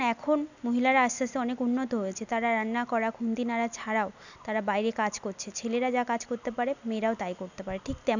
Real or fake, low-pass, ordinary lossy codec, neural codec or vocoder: real; 7.2 kHz; none; none